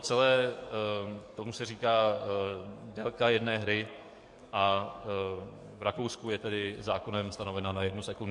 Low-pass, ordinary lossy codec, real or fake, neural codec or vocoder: 10.8 kHz; MP3, 64 kbps; fake; codec, 44.1 kHz, 7.8 kbps, DAC